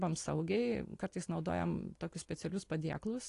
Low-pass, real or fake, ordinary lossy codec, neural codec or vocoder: 10.8 kHz; real; AAC, 48 kbps; none